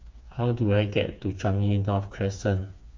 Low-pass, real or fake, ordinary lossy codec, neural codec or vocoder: 7.2 kHz; fake; MP3, 48 kbps; codec, 16 kHz, 4 kbps, FreqCodec, smaller model